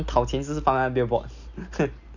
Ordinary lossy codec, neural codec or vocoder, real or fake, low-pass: none; none; real; 7.2 kHz